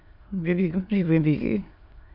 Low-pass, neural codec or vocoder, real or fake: 5.4 kHz; autoencoder, 22.05 kHz, a latent of 192 numbers a frame, VITS, trained on many speakers; fake